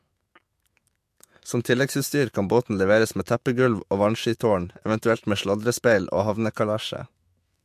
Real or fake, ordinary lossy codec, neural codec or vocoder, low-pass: fake; MP3, 64 kbps; autoencoder, 48 kHz, 128 numbers a frame, DAC-VAE, trained on Japanese speech; 14.4 kHz